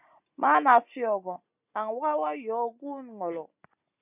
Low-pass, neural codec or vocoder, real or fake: 3.6 kHz; none; real